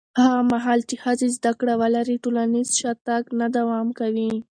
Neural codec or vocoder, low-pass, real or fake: none; 9.9 kHz; real